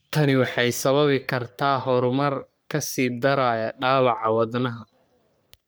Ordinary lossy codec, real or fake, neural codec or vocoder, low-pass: none; fake; codec, 44.1 kHz, 3.4 kbps, Pupu-Codec; none